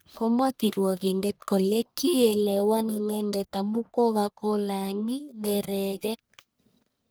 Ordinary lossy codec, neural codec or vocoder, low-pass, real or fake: none; codec, 44.1 kHz, 1.7 kbps, Pupu-Codec; none; fake